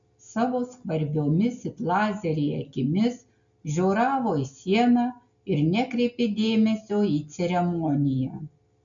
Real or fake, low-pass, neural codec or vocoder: real; 7.2 kHz; none